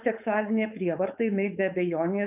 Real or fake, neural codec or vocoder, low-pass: fake; codec, 16 kHz, 4.8 kbps, FACodec; 3.6 kHz